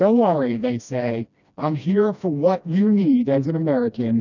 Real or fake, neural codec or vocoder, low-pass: fake; codec, 16 kHz, 1 kbps, FreqCodec, smaller model; 7.2 kHz